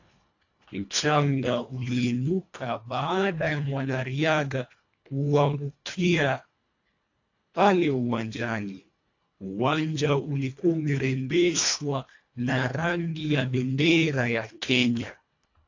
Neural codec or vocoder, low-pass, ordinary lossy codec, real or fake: codec, 24 kHz, 1.5 kbps, HILCodec; 7.2 kHz; AAC, 48 kbps; fake